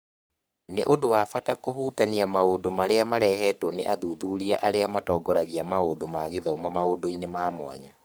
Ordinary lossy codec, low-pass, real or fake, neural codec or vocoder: none; none; fake; codec, 44.1 kHz, 3.4 kbps, Pupu-Codec